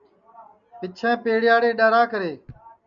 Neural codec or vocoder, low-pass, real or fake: none; 7.2 kHz; real